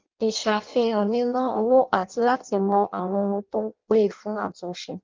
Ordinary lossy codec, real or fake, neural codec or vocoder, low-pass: Opus, 32 kbps; fake; codec, 16 kHz in and 24 kHz out, 0.6 kbps, FireRedTTS-2 codec; 7.2 kHz